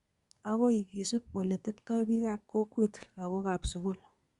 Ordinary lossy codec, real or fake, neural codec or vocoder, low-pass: Opus, 64 kbps; fake; codec, 24 kHz, 1 kbps, SNAC; 10.8 kHz